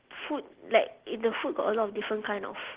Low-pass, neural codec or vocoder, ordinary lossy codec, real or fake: 3.6 kHz; none; Opus, 16 kbps; real